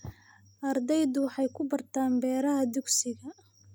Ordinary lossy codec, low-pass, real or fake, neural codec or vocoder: none; none; real; none